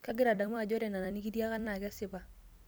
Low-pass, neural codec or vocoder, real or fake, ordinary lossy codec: none; vocoder, 44.1 kHz, 128 mel bands every 256 samples, BigVGAN v2; fake; none